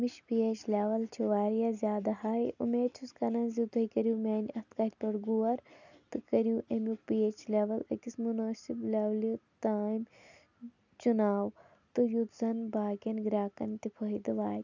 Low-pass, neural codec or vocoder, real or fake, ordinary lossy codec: 7.2 kHz; none; real; none